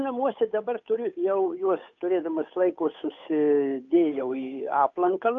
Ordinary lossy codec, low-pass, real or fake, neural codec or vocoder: AAC, 48 kbps; 7.2 kHz; fake; codec, 16 kHz, 8 kbps, FunCodec, trained on Chinese and English, 25 frames a second